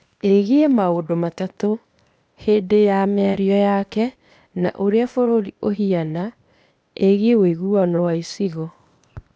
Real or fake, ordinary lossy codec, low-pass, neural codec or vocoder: fake; none; none; codec, 16 kHz, 0.8 kbps, ZipCodec